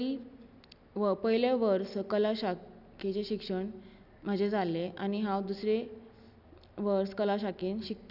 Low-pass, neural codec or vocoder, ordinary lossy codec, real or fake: 5.4 kHz; none; none; real